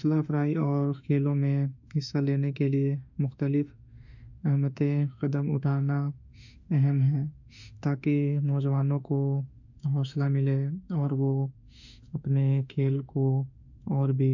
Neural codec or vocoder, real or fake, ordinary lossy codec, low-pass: autoencoder, 48 kHz, 32 numbers a frame, DAC-VAE, trained on Japanese speech; fake; none; 7.2 kHz